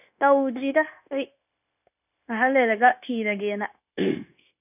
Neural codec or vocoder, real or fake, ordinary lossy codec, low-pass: codec, 16 kHz, 0.9 kbps, LongCat-Audio-Codec; fake; none; 3.6 kHz